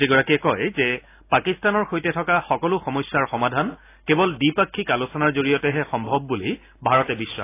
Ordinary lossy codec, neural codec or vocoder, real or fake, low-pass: AAC, 24 kbps; none; real; 3.6 kHz